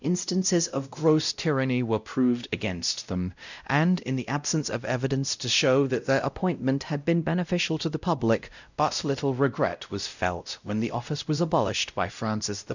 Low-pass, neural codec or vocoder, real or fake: 7.2 kHz; codec, 16 kHz, 0.5 kbps, X-Codec, WavLM features, trained on Multilingual LibriSpeech; fake